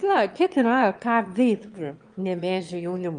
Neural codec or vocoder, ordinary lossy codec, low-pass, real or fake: autoencoder, 22.05 kHz, a latent of 192 numbers a frame, VITS, trained on one speaker; Opus, 32 kbps; 9.9 kHz; fake